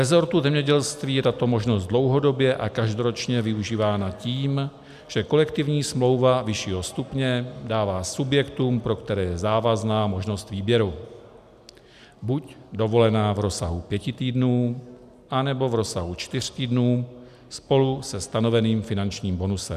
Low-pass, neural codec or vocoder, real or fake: 14.4 kHz; none; real